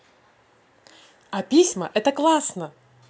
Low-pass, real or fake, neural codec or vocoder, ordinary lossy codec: none; real; none; none